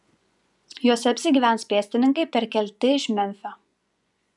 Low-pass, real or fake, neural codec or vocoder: 10.8 kHz; fake; vocoder, 24 kHz, 100 mel bands, Vocos